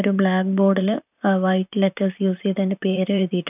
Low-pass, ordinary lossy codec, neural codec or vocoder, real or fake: 3.6 kHz; none; vocoder, 44.1 kHz, 128 mel bands, Pupu-Vocoder; fake